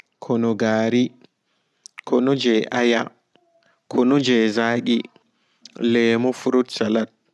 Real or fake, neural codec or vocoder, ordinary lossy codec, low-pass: real; none; none; none